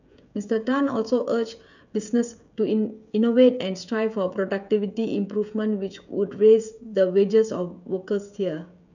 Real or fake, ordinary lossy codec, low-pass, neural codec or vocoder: fake; none; 7.2 kHz; codec, 16 kHz, 16 kbps, FreqCodec, smaller model